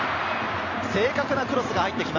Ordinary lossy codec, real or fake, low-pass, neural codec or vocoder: MP3, 64 kbps; real; 7.2 kHz; none